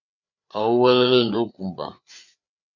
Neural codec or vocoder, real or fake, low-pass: codec, 16 kHz, 4 kbps, FreqCodec, larger model; fake; 7.2 kHz